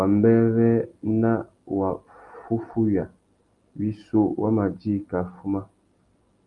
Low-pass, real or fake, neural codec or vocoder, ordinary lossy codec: 10.8 kHz; real; none; Opus, 32 kbps